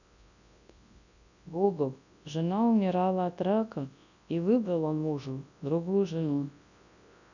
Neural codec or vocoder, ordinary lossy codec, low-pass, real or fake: codec, 24 kHz, 0.9 kbps, WavTokenizer, large speech release; none; 7.2 kHz; fake